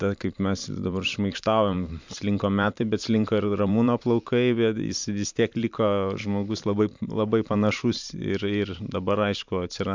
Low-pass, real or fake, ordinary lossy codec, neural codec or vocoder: 7.2 kHz; fake; MP3, 64 kbps; vocoder, 44.1 kHz, 128 mel bands every 512 samples, BigVGAN v2